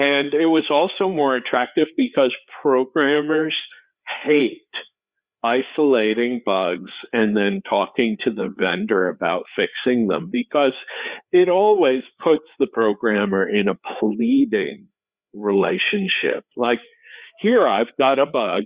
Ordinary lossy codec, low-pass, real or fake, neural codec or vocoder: Opus, 64 kbps; 3.6 kHz; fake; codec, 16 kHz, 4 kbps, FreqCodec, larger model